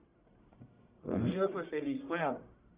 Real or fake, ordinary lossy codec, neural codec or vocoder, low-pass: fake; Opus, 64 kbps; codec, 44.1 kHz, 1.7 kbps, Pupu-Codec; 3.6 kHz